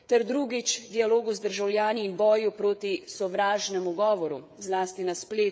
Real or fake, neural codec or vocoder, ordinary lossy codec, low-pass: fake; codec, 16 kHz, 16 kbps, FreqCodec, smaller model; none; none